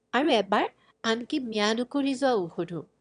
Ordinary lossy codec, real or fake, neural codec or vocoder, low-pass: none; fake; autoencoder, 22.05 kHz, a latent of 192 numbers a frame, VITS, trained on one speaker; 9.9 kHz